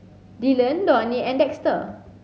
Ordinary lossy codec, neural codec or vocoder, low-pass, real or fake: none; none; none; real